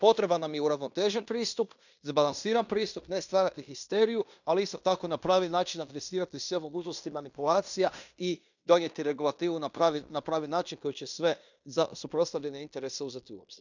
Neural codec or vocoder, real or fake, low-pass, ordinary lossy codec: codec, 16 kHz in and 24 kHz out, 0.9 kbps, LongCat-Audio-Codec, fine tuned four codebook decoder; fake; 7.2 kHz; none